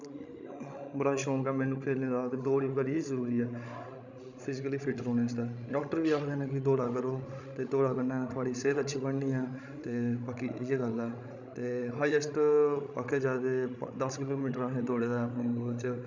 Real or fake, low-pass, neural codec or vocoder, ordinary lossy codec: fake; 7.2 kHz; codec, 16 kHz, 16 kbps, FreqCodec, larger model; none